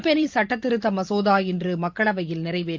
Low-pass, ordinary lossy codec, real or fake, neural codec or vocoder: 7.2 kHz; Opus, 24 kbps; fake; vocoder, 44.1 kHz, 128 mel bands every 512 samples, BigVGAN v2